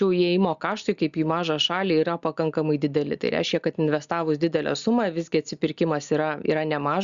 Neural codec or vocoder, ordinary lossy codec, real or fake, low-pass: none; Opus, 64 kbps; real; 7.2 kHz